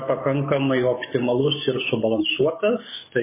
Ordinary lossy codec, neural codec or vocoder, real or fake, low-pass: MP3, 16 kbps; codec, 44.1 kHz, 7.8 kbps, DAC; fake; 3.6 kHz